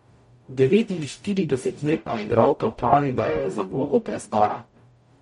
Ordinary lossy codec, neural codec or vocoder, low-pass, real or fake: MP3, 48 kbps; codec, 44.1 kHz, 0.9 kbps, DAC; 19.8 kHz; fake